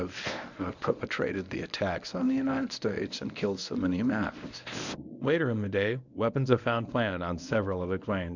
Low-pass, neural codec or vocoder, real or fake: 7.2 kHz; codec, 24 kHz, 0.9 kbps, WavTokenizer, medium speech release version 1; fake